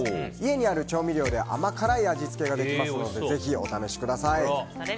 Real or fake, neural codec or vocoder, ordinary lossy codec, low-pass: real; none; none; none